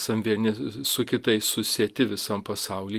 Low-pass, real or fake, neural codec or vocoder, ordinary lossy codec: 14.4 kHz; real; none; Opus, 32 kbps